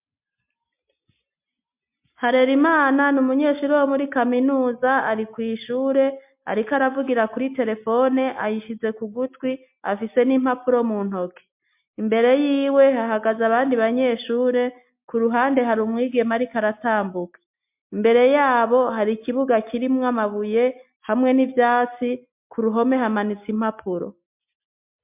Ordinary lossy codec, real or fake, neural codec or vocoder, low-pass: MP3, 32 kbps; real; none; 3.6 kHz